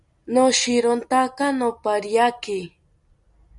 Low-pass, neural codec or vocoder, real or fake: 10.8 kHz; none; real